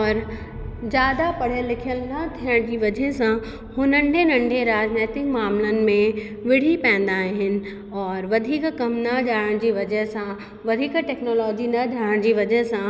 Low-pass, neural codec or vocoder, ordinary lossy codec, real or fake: none; none; none; real